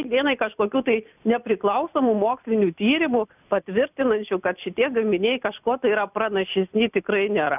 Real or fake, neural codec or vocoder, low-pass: real; none; 3.6 kHz